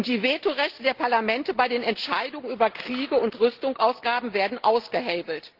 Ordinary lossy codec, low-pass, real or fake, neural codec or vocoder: Opus, 16 kbps; 5.4 kHz; real; none